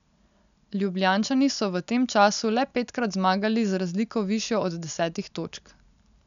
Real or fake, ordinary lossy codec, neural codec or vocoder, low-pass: real; none; none; 7.2 kHz